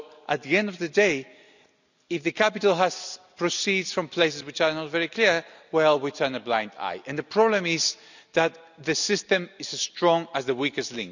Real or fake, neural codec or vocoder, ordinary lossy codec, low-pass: real; none; none; 7.2 kHz